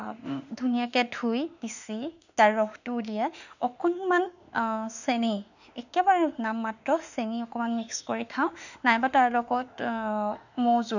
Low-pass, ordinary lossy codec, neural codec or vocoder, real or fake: 7.2 kHz; none; autoencoder, 48 kHz, 32 numbers a frame, DAC-VAE, trained on Japanese speech; fake